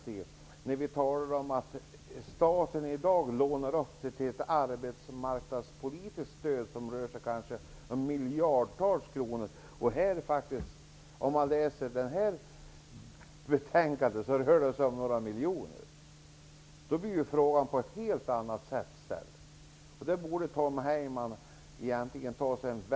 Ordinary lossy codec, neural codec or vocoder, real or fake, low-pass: none; none; real; none